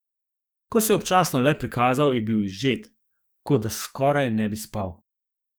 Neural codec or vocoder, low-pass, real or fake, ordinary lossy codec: codec, 44.1 kHz, 2.6 kbps, SNAC; none; fake; none